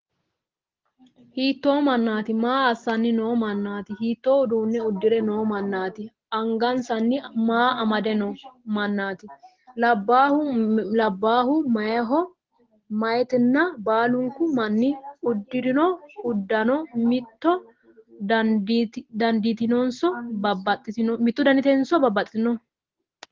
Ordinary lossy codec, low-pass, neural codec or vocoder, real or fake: Opus, 16 kbps; 7.2 kHz; none; real